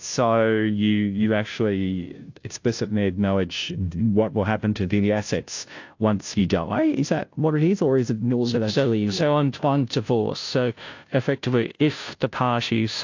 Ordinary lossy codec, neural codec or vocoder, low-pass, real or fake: AAC, 48 kbps; codec, 16 kHz, 0.5 kbps, FunCodec, trained on Chinese and English, 25 frames a second; 7.2 kHz; fake